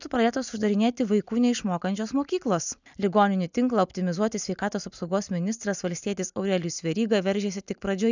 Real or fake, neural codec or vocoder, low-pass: real; none; 7.2 kHz